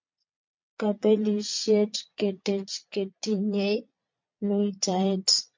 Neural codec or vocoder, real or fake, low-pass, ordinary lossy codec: vocoder, 22.05 kHz, 80 mel bands, Vocos; fake; 7.2 kHz; MP3, 48 kbps